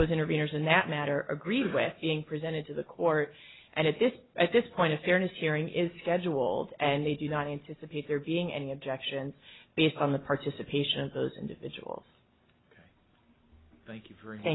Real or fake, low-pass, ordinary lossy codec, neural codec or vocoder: real; 7.2 kHz; AAC, 16 kbps; none